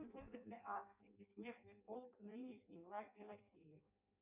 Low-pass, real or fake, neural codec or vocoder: 3.6 kHz; fake; codec, 16 kHz in and 24 kHz out, 0.6 kbps, FireRedTTS-2 codec